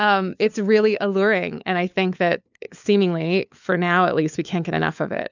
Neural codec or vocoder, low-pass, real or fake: codec, 16 kHz, 4 kbps, FunCodec, trained on LibriTTS, 50 frames a second; 7.2 kHz; fake